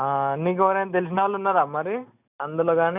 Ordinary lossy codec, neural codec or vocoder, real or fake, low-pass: none; none; real; 3.6 kHz